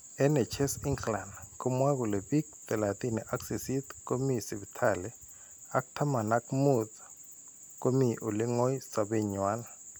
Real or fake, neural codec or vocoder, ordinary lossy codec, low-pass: real; none; none; none